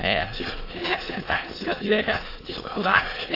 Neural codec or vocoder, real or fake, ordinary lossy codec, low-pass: autoencoder, 22.05 kHz, a latent of 192 numbers a frame, VITS, trained on many speakers; fake; AAC, 24 kbps; 5.4 kHz